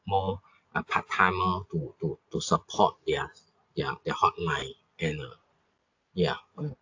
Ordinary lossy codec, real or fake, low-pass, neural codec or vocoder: AAC, 48 kbps; real; 7.2 kHz; none